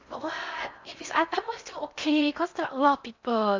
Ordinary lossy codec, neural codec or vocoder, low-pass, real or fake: MP3, 64 kbps; codec, 16 kHz in and 24 kHz out, 0.8 kbps, FocalCodec, streaming, 65536 codes; 7.2 kHz; fake